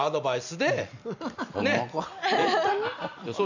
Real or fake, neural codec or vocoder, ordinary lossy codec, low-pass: real; none; none; 7.2 kHz